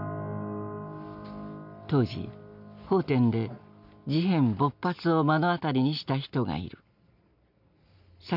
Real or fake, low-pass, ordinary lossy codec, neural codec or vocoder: real; 5.4 kHz; none; none